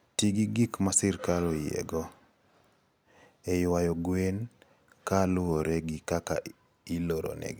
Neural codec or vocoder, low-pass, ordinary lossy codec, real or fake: none; none; none; real